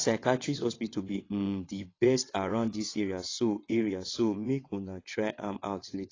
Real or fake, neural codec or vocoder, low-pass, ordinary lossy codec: fake; vocoder, 22.05 kHz, 80 mel bands, WaveNeXt; 7.2 kHz; AAC, 32 kbps